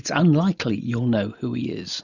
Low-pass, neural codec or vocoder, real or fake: 7.2 kHz; none; real